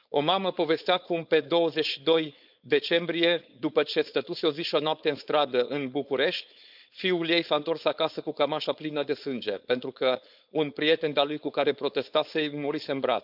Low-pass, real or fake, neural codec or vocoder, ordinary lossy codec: 5.4 kHz; fake; codec, 16 kHz, 4.8 kbps, FACodec; none